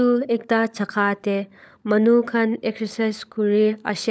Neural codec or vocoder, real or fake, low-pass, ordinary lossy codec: codec, 16 kHz, 16 kbps, FunCodec, trained on LibriTTS, 50 frames a second; fake; none; none